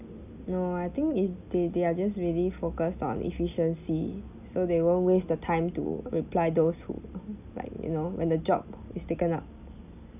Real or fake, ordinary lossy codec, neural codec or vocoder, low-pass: real; none; none; 3.6 kHz